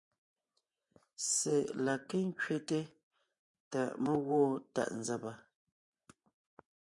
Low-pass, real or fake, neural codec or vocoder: 10.8 kHz; fake; vocoder, 24 kHz, 100 mel bands, Vocos